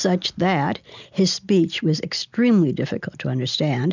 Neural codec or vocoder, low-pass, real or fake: none; 7.2 kHz; real